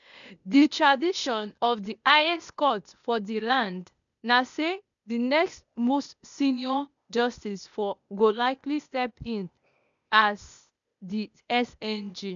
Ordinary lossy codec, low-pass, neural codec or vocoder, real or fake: none; 7.2 kHz; codec, 16 kHz, 0.8 kbps, ZipCodec; fake